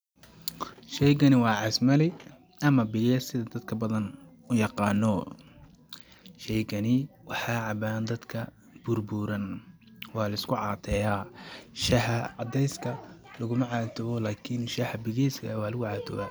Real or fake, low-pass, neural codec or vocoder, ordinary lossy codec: real; none; none; none